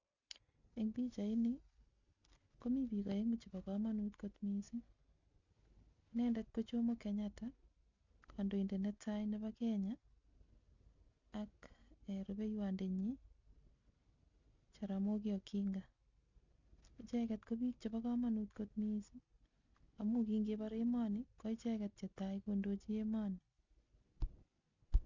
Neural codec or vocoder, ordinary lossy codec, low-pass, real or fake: none; none; 7.2 kHz; real